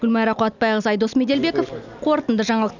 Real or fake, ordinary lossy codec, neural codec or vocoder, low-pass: real; none; none; 7.2 kHz